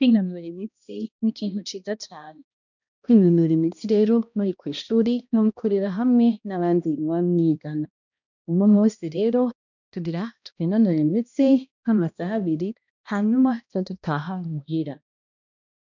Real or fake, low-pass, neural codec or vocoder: fake; 7.2 kHz; codec, 16 kHz, 1 kbps, X-Codec, HuBERT features, trained on balanced general audio